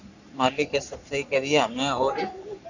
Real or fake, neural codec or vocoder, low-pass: fake; codec, 44.1 kHz, 3.4 kbps, Pupu-Codec; 7.2 kHz